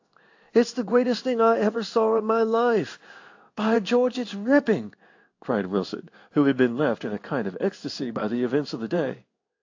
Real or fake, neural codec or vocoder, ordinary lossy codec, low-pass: fake; codec, 16 kHz in and 24 kHz out, 1 kbps, XY-Tokenizer; AAC, 48 kbps; 7.2 kHz